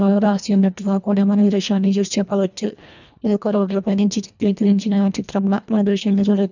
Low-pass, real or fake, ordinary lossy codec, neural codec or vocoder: 7.2 kHz; fake; none; codec, 24 kHz, 1.5 kbps, HILCodec